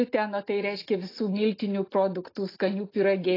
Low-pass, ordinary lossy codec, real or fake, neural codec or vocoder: 5.4 kHz; AAC, 24 kbps; real; none